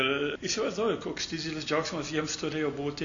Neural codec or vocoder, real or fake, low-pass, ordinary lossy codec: none; real; 7.2 kHz; MP3, 64 kbps